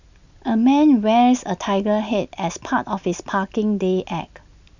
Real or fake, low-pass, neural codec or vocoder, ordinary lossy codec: real; 7.2 kHz; none; none